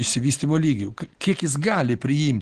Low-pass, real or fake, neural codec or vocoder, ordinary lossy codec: 9.9 kHz; real; none; Opus, 16 kbps